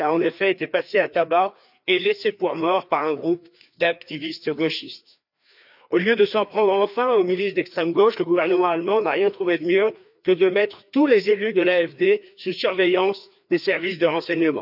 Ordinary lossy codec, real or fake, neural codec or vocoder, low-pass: none; fake; codec, 16 kHz, 2 kbps, FreqCodec, larger model; 5.4 kHz